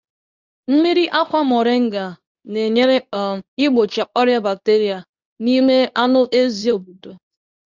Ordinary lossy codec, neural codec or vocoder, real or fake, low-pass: none; codec, 24 kHz, 0.9 kbps, WavTokenizer, medium speech release version 2; fake; 7.2 kHz